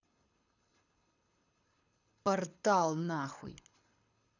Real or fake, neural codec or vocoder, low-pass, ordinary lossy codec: fake; codec, 24 kHz, 6 kbps, HILCodec; 7.2 kHz; none